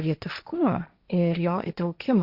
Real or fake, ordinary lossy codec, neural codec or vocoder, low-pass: fake; Opus, 64 kbps; codec, 16 kHz, 1.1 kbps, Voila-Tokenizer; 5.4 kHz